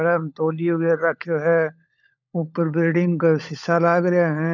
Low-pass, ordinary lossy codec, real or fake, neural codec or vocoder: 7.2 kHz; none; fake; codec, 16 kHz, 16 kbps, FunCodec, trained on LibriTTS, 50 frames a second